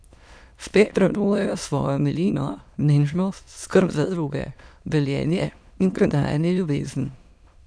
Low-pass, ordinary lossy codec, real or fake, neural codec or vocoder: none; none; fake; autoencoder, 22.05 kHz, a latent of 192 numbers a frame, VITS, trained on many speakers